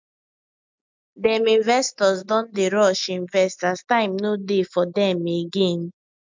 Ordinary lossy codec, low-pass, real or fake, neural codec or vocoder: MP3, 64 kbps; 7.2 kHz; real; none